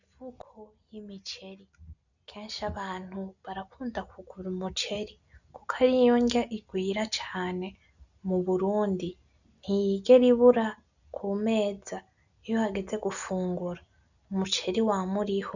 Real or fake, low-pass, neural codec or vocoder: real; 7.2 kHz; none